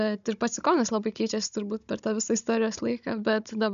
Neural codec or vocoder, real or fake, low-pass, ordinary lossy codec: codec, 16 kHz, 16 kbps, FunCodec, trained on Chinese and English, 50 frames a second; fake; 7.2 kHz; MP3, 96 kbps